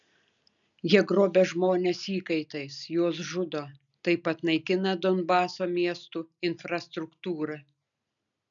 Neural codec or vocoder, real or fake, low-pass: none; real; 7.2 kHz